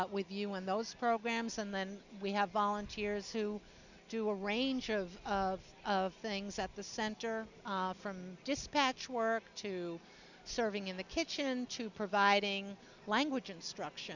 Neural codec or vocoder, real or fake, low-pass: none; real; 7.2 kHz